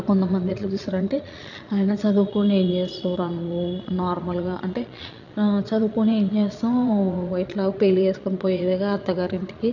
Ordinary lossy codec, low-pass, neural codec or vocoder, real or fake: none; 7.2 kHz; vocoder, 22.05 kHz, 80 mel bands, Vocos; fake